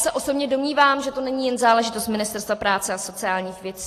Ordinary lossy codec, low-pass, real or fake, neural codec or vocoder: AAC, 48 kbps; 14.4 kHz; real; none